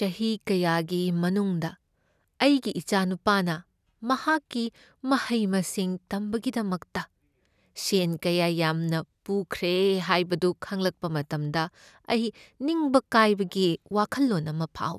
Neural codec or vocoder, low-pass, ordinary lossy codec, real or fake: none; 14.4 kHz; none; real